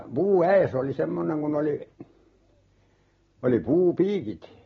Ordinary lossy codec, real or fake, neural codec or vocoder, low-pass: AAC, 24 kbps; real; none; 7.2 kHz